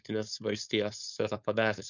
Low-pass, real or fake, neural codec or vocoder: 7.2 kHz; fake; codec, 16 kHz, 4.8 kbps, FACodec